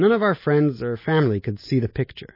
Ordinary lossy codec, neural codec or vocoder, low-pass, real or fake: MP3, 24 kbps; none; 5.4 kHz; real